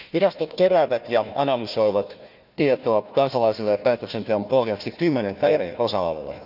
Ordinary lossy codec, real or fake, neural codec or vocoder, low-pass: none; fake; codec, 16 kHz, 1 kbps, FunCodec, trained on Chinese and English, 50 frames a second; 5.4 kHz